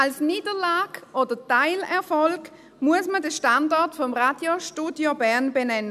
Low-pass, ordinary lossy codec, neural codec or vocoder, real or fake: 14.4 kHz; none; none; real